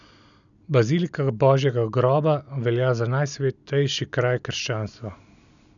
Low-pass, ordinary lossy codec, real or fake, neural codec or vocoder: 7.2 kHz; none; real; none